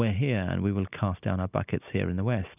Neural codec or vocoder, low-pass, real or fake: none; 3.6 kHz; real